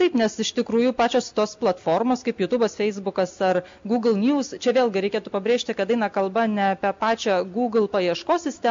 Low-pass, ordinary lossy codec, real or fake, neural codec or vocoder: 7.2 kHz; MP3, 48 kbps; real; none